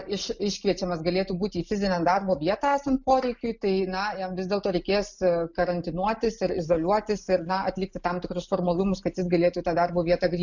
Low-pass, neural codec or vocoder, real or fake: 7.2 kHz; none; real